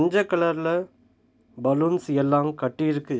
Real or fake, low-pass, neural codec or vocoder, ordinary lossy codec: real; none; none; none